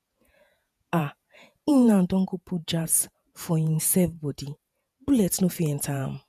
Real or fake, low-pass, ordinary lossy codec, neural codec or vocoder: fake; 14.4 kHz; none; vocoder, 48 kHz, 128 mel bands, Vocos